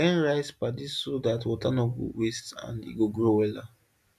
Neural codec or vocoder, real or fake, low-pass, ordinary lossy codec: none; real; 14.4 kHz; none